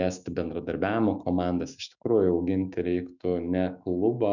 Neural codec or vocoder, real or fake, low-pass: autoencoder, 48 kHz, 128 numbers a frame, DAC-VAE, trained on Japanese speech; fake; 7.2 kHz